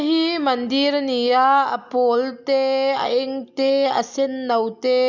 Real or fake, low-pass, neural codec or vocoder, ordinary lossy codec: real; 7.2 kHz; none; none